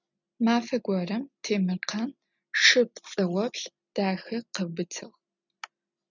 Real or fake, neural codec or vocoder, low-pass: real; none; 7.2 kHz